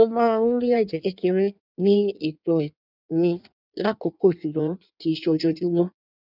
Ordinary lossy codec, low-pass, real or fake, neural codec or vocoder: none; 5.4 kHz; fake; codec, 16 kHz in and 24 kHz out, 1.1 kbps, FireRedTTS-2 codec